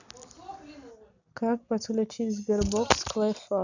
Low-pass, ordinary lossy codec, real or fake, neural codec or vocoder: 7.2 kHz; none; real; none